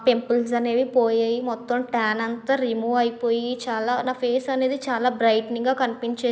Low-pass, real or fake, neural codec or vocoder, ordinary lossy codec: none; real; none; none